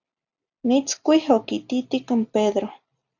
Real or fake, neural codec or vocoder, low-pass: real; none; 7.2 kHz